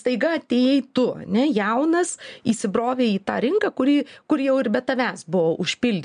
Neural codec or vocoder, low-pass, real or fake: none; 9.9 kHz; real